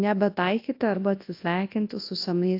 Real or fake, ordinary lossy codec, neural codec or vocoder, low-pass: fake; AAC, 32 kbps; codec, 24 kHz, 0.9 kbps, WavTokenizer, large speech release; 5.4 kHz